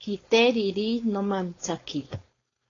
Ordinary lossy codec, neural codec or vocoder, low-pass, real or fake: AAC, 32 kbps; codec, 16 kHz, 4.8 kbps, FACodec; 7.2 kHz; fake